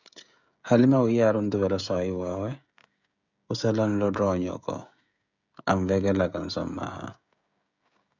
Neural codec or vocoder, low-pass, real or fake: codec, 16 kHz, 16 kbps, FreqCodec, smaller model; 7.2 kHz; fake